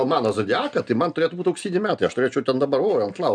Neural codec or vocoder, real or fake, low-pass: none; real; 9.9 kHz